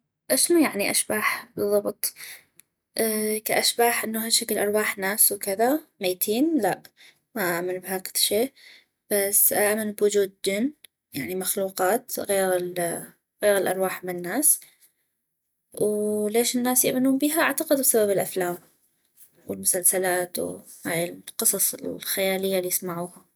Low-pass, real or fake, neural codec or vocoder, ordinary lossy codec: none; real; none; none